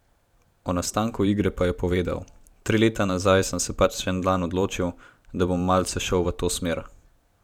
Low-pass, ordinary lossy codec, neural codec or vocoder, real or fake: 19.8 kHz; none; vocoder, 44.1 kHz, 128 mel bands every 512 samples, BigVGAN v2; fake